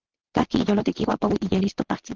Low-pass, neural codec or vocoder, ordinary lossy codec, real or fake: 7.2 kHz; vocoder, 22.05 kHz, 80 mel bands, Vocos; Opus, 16 kbps; fake